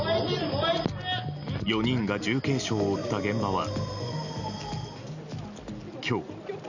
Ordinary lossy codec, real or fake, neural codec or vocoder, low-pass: none; real; none; 7.2 kHz